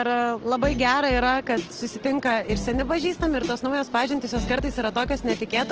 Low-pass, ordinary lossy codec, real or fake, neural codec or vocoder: 7.2 kHz; Opus, 16 kbps; real; none